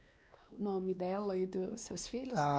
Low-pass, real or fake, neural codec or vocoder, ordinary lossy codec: none; fake; codec, 16 kHz, 2 kbps, X-Codec, WavLM features, trained on Multilingual LibriSpeech; none